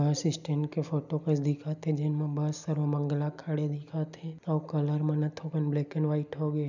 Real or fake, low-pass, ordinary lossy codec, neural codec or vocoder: real; 7.2 kHz; none; none